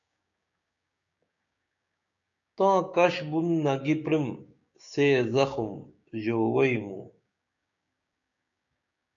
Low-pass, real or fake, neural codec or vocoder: 7.2 kHz; fake; codec, 16 kHz, 6 kbps, DAC